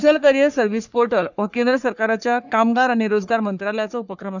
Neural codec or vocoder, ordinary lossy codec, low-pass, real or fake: codec, 44.1 kHz, 3.4 kbps, Pupu-Codec; none; 7.2 kHz; fake